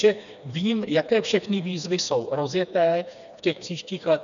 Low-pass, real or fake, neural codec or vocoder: 7.2 kHz; fake; codec, 16 kHz, 2 kbps, FreqCodec, smaller model